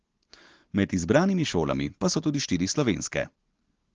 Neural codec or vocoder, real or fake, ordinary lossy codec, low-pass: none; real; Opus, 16 kbps; 7.2 kHz